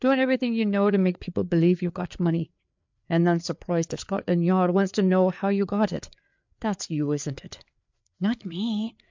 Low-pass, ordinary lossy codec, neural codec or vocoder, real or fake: 7.2 kHz; MP3, 64 kbps; codec, 16 kHz, 4 kbps, FreqCodec, larger model; fake